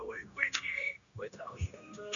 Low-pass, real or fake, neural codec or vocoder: 7.2 kHz; fake; codec, 16 kHz, 0.9 kbps, LongCat-Audio-Codec